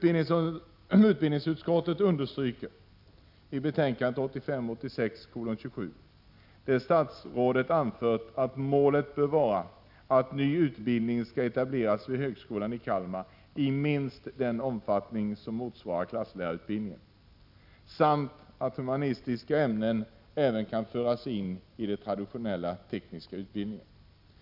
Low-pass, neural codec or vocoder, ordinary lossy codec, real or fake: 5.4 kHz; none; none; real